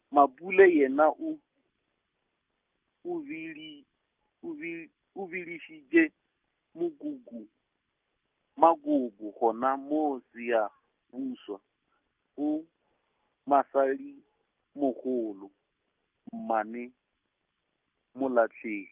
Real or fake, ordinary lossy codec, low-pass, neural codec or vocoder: real; Opus, 24 kbps; 3.6 kHz; none